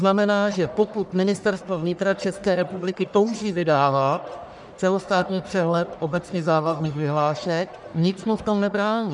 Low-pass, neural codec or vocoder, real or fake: 10.8 kHz; codec, 44.1 kHz, 1.7 kbps, Pupu-Codec; fake